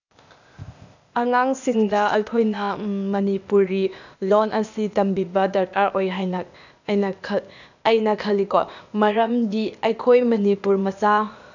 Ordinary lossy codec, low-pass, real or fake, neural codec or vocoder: none; 7.2 kHz; fake; codec, 16 kHz, 0.8 kbps, ZipCodec